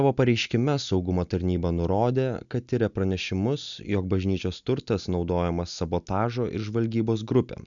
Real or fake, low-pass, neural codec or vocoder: real; 7.2 kHz; none